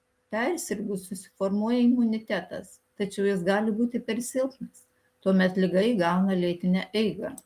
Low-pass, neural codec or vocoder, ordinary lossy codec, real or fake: 14.4 kHz; none; Opus, 32 kbps; real